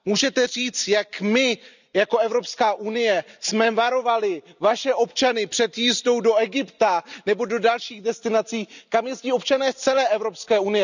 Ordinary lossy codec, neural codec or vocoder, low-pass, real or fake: none; none; 7.2 kHz; real